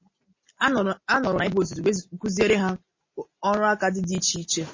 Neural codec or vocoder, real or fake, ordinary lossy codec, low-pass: none; real; MP3, 32 kbps; 7.2 kHz